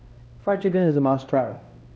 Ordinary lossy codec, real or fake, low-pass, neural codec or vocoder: none; fake; none; codec, 16 kHz, 1 kbps, X-Codec, HuBERT features, trained on LibriSpeech